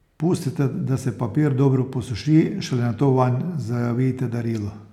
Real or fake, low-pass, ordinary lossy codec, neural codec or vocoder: real; 19.8 kHz; none; none